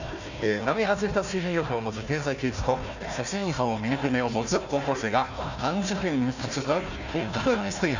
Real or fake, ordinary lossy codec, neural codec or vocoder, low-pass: fake; none; codec, 16 kHz, 1 kbps, FunCodec, trained on Chinese and English, 50 frames a second; 7.2 kHz